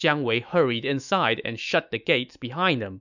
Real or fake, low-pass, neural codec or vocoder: fake; 7.2 kHz; autoencoder, 48 kHz, 128 numbers a frame, DAC-VAE, trained on Japanese speech